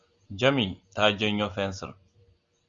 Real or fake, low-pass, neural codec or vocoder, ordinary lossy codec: real; 7.2 kHz; none; Opus, 64 kbps